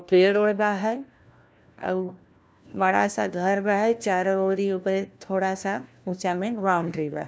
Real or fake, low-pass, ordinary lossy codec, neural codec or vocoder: fake; none; none; codec, 16 kHz, 1 kbps, FunCodec, trained on LibriTTS, 50 frames a second